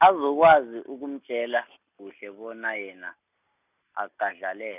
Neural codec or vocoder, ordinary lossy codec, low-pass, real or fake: none; none; 3.6 kHz; real